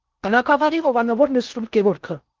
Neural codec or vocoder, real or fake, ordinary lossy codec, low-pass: codec, 16 kHz in and 24 kHz out, 0.6 kbps, FocalCodec, streaming, 2048 codes; fake; Opus, 32 kbps; 7.2 kHz